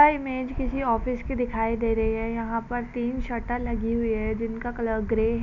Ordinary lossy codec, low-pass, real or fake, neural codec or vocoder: none; 7.2 kHz; real; none